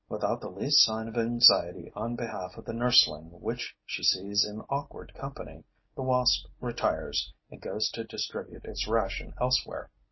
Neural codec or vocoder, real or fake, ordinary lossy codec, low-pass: none; real; MP3, 24 kbps; 7.2 kHz